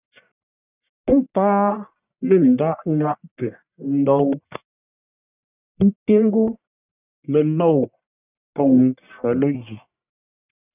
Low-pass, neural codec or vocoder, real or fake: 3.6 kHz; codec, 44.1 kHz, 1.7 kbps, Pupu-Codec; fake